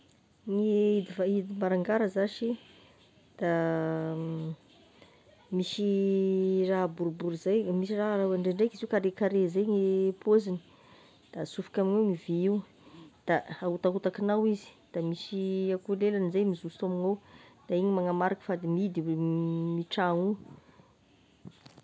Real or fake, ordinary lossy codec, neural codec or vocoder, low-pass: real; none; none; none